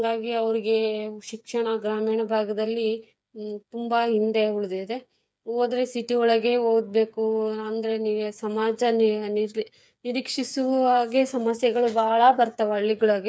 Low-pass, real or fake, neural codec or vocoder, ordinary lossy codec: none; fake; codec, 16 kHz, 8 kbps, FreqCodec, smaller model; none